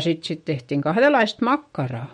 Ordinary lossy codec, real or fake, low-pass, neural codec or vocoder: MP3, 48 kbps; fake; 19.8 kHz; autoencoder, 48 kHz, 128 numbers a frame, DAC-VAE, trained on Japanese speech